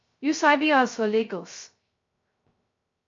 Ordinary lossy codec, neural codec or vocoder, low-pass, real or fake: MP3, 48 kbps; codec, 16 kHz, 0.2 kbps, FocalCodec; 7.2 kHz; fake